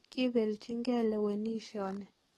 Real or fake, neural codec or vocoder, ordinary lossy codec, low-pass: fake; codec, 44.1 kHz, 7.8 kbps, DAC; AAC, 32 kbps; 19.8 kHz